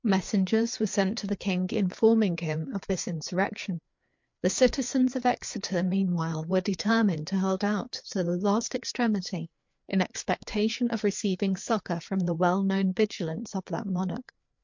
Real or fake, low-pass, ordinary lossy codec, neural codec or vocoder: fake; 7.2 kHz; MP3, 48 kbps; codec, 16 kHz, 4 kbps, FreqCodec, larger model